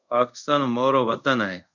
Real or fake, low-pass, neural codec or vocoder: fake; 7.2 kHz; codec, 24 kHz, 0.5 kbps, DualCodec